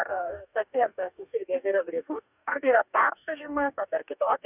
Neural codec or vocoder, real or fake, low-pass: codec, 44.1 kHz, 2.6 kbps, DAC; fake; 3.6 kHz